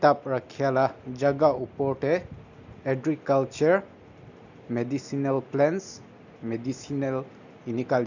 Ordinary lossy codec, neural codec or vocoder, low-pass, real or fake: none; none; 7.2 kHz; real